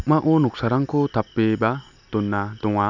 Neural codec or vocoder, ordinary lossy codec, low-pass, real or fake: none; none; 7.2 kHz; real